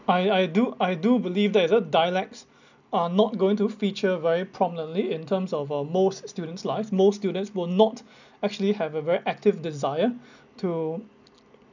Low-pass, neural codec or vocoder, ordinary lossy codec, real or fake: 7.2 kHz; none; none; real